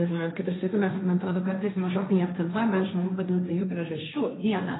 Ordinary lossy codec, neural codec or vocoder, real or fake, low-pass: AAC, 16 kbps; codec, 16 kHz, 1.1 kbps, Voila-Tokenizer; fake; 7.2 kHz